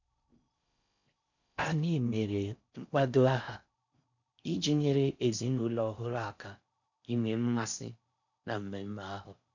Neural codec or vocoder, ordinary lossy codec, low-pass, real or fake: codec, 16 kHz in and 24 kHz out, 0.6 kbps, FocalCodec, streaming, 4096 codes; none; 7.2 kHz; fake